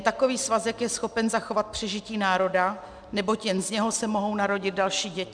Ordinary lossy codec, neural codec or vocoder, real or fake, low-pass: MP3, 96 kbps; none; real; 9.9 kHz